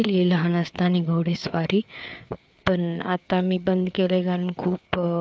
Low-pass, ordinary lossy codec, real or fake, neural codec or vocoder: none; none; fake; codec, 16 kHz, 4 kbps, FreqCodec, larger model